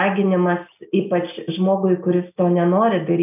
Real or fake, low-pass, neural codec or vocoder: real; 3.6 kHz; none